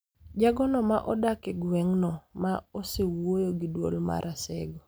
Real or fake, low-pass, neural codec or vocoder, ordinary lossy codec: real; none; none; none